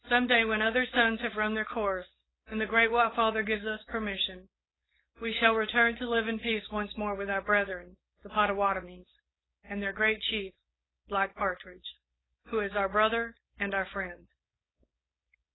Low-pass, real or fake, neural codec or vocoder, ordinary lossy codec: 7.2 kHz; fake; codec, 16 kHz, 4.8 kbps, FACodec; AAC, 16 kbps